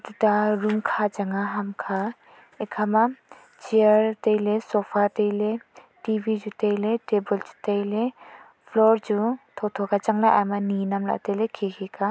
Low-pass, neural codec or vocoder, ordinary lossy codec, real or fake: none; none; none; real